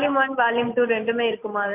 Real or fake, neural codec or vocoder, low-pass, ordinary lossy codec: real; none; 3.6 kHz; MP3, 32 kbps